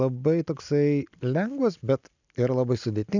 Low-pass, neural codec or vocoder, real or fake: 7.2 kHz; none; real